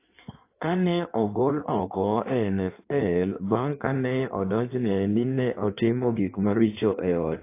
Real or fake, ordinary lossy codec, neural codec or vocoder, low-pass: fake; AAC, 24 kbps; codec, 16 kHz in and 24 kHz out, 1.1 kbps, FireRedTTS-2 codec; 3.6 kHz